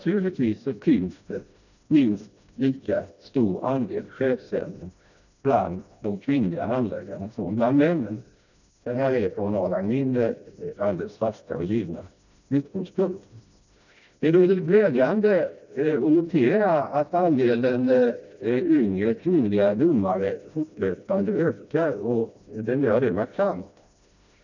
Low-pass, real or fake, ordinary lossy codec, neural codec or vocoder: 7.2 kHz; fake; none; codec, 16 kHz, 1 kbps, FreqCodec, smaller model